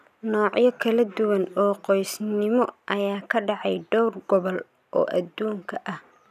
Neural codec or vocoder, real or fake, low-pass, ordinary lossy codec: none; real; 14.4 kHz; none